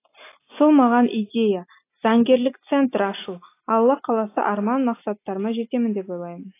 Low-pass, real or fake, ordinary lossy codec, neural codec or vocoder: 3.6 kHz; real; AAC, 24 kbps; none